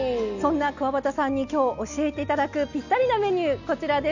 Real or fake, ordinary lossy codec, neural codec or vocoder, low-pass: real; none; none; 7.2 kHz